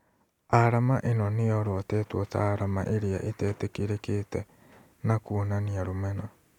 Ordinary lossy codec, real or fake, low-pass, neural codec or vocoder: none; real; 19.8 kHz; none